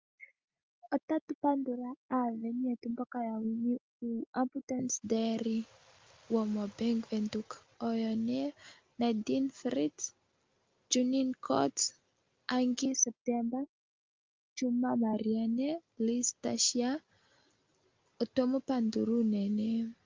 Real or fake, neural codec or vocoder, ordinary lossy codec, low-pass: real; none; Opus, 32 kbps; 7.2 kHz